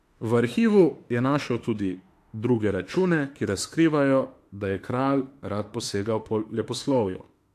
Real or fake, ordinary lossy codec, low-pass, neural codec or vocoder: fake; AAC, 64 kbps; 14.4 kHz; autoencoder, 48 kHz, 32 numbers a frame, DAC-VAE, trained on Japanese speech